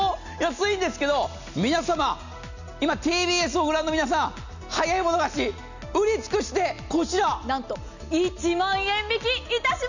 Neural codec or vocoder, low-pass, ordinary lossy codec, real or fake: none; 7.2 kHz; none; real